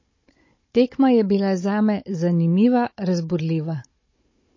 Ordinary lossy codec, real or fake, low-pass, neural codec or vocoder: MP3, 32 kbps; fake; 7.2 kHz; codec, 16 kHz, 16 kbps, FunCodec, trained on Chinese and English, 50 frames a second